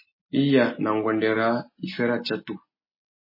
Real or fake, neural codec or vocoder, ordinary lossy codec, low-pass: real; none; MP3, 24 kbps; 5.4 kHz